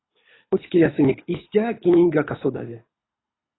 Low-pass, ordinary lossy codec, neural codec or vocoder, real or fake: 7.2 kHz; AAC, 16 kbps; codec, 24 kHz, 6 kbps, HILCodec; fake